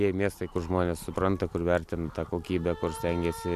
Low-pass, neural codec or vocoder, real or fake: 14.4 kHz; none; real